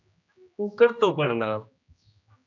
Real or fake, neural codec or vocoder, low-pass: fake; codec, 16 kHz, 1 kbps, X-Codec, HuBERT features, trained on general audio; 7.2 kHz